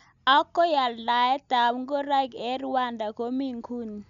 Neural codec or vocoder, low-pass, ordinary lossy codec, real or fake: none; 7.2 kHz; none; real